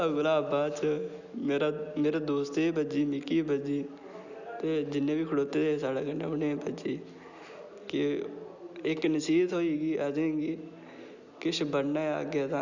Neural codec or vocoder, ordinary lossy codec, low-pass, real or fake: none; none; 7.2 kHz; real